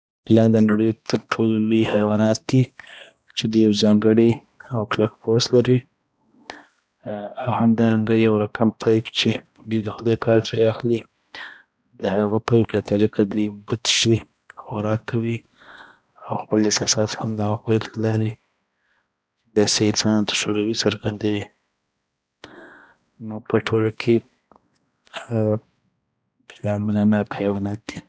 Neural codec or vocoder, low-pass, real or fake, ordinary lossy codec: codec, 16 kHz, 1 kbps, X-Codec, HuBERT features, trained on balanced general audio; none; fake; none